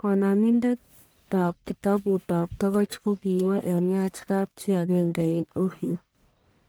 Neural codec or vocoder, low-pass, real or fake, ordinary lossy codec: codec, 44.1 kHz, 1.7 kbps, Pupu-Codec; none; fake; none